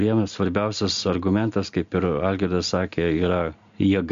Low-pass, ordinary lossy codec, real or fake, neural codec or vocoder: 7.2 kHz; MP3, 48 kbps; real; none